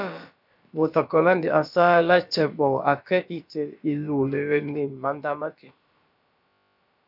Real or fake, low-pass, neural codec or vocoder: fake; 5.4 kHz; codec, 16 kHz, about 1 kbps, DyCAST, with the encoder's durations